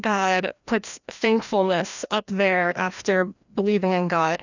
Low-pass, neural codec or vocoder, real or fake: 7.2 kHz; codec, 16 kHz, 1 kbps, FreqCodec, larger model; fake